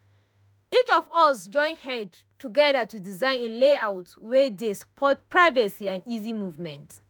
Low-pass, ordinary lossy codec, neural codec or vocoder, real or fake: none; none; autoencoder, 48 kHz, 32 numbers a frame, DAC-VAE, trained on Japanese speech; fake